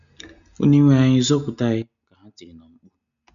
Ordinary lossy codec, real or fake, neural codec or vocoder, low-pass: none; real; none; 7.2 kHz